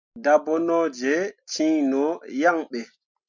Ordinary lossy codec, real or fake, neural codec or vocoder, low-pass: MP3, 64 kbps; real; none; 7.2 kHz